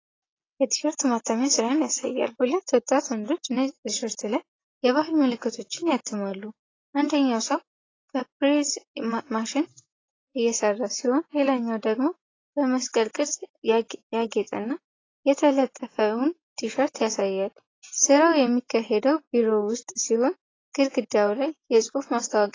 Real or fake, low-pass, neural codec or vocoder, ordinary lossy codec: real; 7.2 kHz; none; AAC, 32 kbps